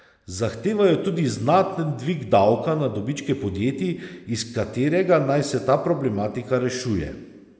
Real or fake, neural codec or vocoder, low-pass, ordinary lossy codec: real; none; none; none